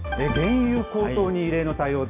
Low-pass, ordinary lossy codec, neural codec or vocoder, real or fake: 3.6 kHz; none; none; real